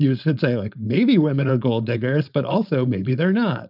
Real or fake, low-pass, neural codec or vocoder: fake; 5.4 kHz; codec, 16 kHz, 4.8 kbps, FACodec